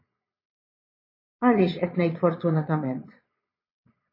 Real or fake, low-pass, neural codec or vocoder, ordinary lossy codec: fake; 5.4 kHz; vocoder, 22.05 kHz, 80 mel bands, Vocos; MP3, 24 kbps